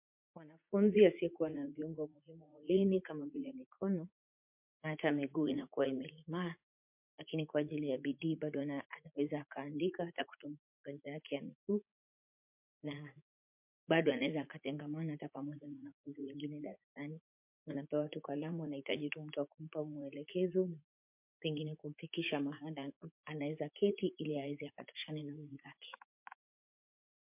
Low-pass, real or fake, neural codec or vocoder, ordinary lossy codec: 3.6 kHz; fake; vocoder, 44.1 kHz, 80 mel bands, Vocos; MP3, 32 kbps